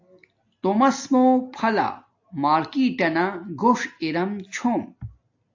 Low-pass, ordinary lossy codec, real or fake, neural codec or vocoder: 7.2 kHz; AAC, 48 kbps; real; none